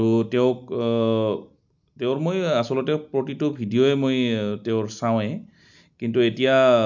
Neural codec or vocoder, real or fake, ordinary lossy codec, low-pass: none; real; none; 7.2 kHz